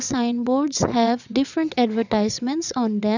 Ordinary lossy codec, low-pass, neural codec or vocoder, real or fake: none; 7.2 kHz; vocoder, 22.05 kHz, 80 mel bands, WaveNeXt; fake